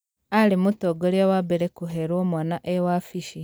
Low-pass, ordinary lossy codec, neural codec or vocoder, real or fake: none; none; none; real